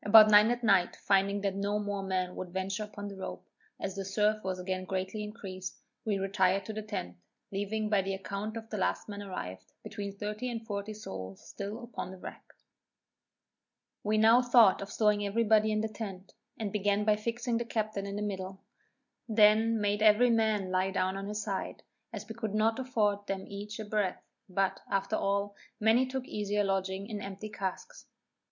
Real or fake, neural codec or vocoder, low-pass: real; none; 7.2 kHz